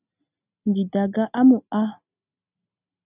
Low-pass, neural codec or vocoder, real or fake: 3.6 kHz; none; real